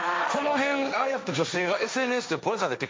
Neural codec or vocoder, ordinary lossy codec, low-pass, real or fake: codec, 16 kHz, 1.1 kbps, Voila-Tokenizer; none; none; fake